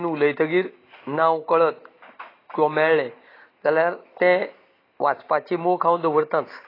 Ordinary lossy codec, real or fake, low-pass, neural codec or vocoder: AAC, 24 kbps; real; 5.4 kHz; none